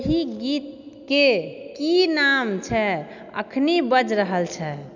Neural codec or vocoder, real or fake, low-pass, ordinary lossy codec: none; real; 7.2 kHz; none